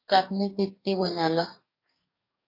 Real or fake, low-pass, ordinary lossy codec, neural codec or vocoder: fake; 5.4 kHz; AAC, 32 kbps; codec, 44.1 kHz, 2.6 kbps, DAC